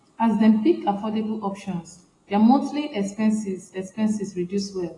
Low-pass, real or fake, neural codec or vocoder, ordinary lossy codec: 10.8 kHz; real; none; AAC, 32 kbps